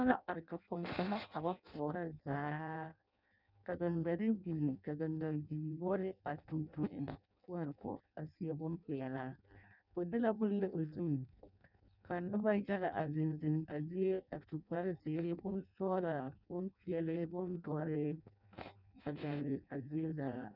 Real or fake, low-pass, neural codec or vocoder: fake; 5.4 kHz; codec, 16 kHz in and 24 kHz out, 0.6 kbps, FireRedTTS-2 codec